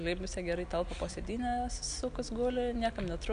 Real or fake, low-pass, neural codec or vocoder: real; 9.9 kHz; none